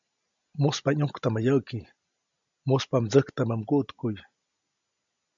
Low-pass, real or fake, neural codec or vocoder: 7.2 kHz; real; none